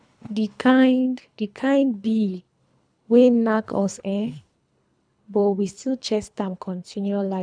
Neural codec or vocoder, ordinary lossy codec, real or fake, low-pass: codec, 24 kHz, 3 kbps, HILCodec; none; fake; 9.9 kHz